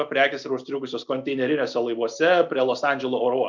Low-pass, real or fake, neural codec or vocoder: 7.2 kHz; real; none